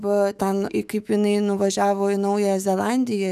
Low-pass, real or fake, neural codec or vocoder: 14.4 kHz; fake; autoencoder, 48 kHz, 128 numbers a frame, DAC-VAE, trained on Japanese speech